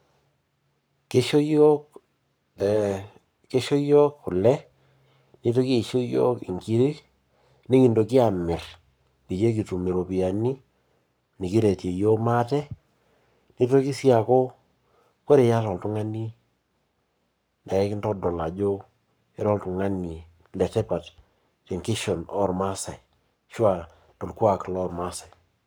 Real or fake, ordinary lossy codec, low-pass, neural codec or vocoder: fake; none; none; codec, 44.1 kHz, 7.8 kbps, Pupu-Codec